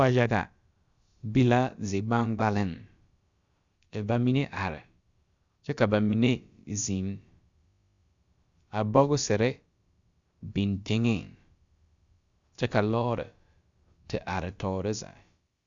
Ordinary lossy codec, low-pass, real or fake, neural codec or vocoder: Opus, 64 kbps; 7.2 kHz; fake; codec, 16 kHz, about 1 kbps, DyCAST, with the encoder's durations